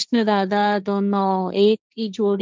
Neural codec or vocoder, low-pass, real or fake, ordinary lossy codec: codec, 16 kHz, 1.1 kbps, Voila-Tokenizer; none; fake; none